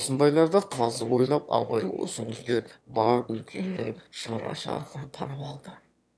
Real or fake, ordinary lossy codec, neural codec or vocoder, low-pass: fake; none; autoencoder, 22.05 kHz, a latent of 192 numbers a frame, VITS, trained on one speaker; none